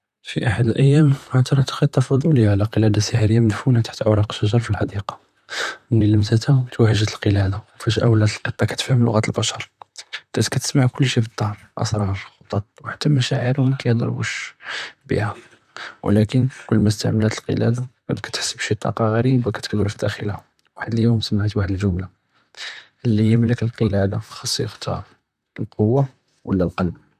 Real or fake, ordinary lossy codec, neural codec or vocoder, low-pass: fake; none; vocoder, 44.1 kHz, 128 mel bands, Pupu-Vocoder; 14.4 kHz